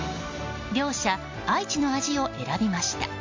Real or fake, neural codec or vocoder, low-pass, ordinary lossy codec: real; none; 7.2 kHz; MP3, 48 kbps